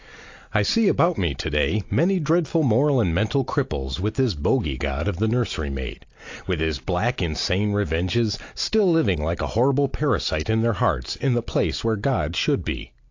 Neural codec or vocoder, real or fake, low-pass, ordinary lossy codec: none; real; 7.2 kHz; AAC, 48 kbps